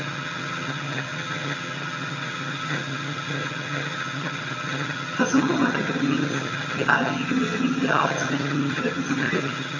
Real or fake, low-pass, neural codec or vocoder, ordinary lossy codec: fake; 7.2 kHz; vocoder, 22.05 kHz, 80 mel bands, HiFi-GAN; AAC, 48 kbps